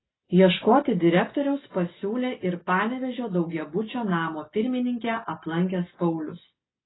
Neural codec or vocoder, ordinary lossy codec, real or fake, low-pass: none; AAC, 16 kbps; real; 7.2 kHz